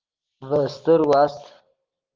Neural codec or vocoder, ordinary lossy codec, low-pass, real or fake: none; Opus, 32 kbps; 7.2 kHz; real